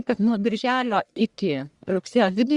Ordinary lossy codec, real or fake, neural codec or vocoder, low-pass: Opus, 64 kbps; fake; codec, 44.1 kHz, 1.7 kbps, Pupu-Codec; 10.8 kHz